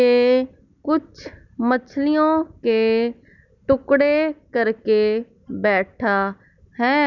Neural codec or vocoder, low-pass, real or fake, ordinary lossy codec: none; 7.2 kHz; real; none